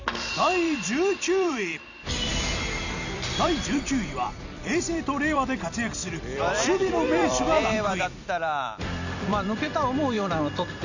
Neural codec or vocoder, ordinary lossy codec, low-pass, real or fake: vocoder, 44.1 kHz, 128 mel bands every 256 samples, BigVGAN v2; none; 7.2 kHz; fake